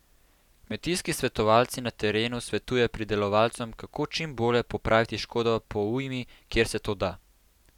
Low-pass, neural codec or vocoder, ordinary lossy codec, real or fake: 19.8 kHz; none; none; real